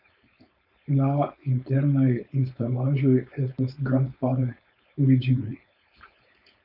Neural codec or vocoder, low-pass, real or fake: codec, 16 kHz, 4.8 kbps, FACodec; 5.4 kHz; fake